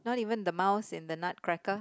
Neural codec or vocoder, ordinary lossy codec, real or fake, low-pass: none; none; real; none